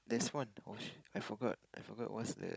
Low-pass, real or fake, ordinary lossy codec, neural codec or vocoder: none; fake; none; codec, 16 kHz, 16 kbps, FunCodec, trained on Chinese and English, 50 frames a second